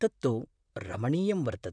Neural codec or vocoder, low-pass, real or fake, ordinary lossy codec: vocoder, 44.1 kHz, 128 mel bands, Pupu-Vocoder; 9.9 kHz; fake; none